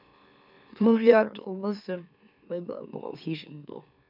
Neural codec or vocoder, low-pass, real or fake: autoencoder, 44.1 kHz, a latent of 192 numbers a frame, MeloTTS; 5.4 kHz; fake